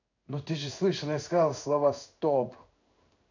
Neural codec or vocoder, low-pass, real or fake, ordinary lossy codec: codec, 16 kHz in and 24 kHz out, 1 kbps, XY-Tokenizer; 7.2 kHz; fake; none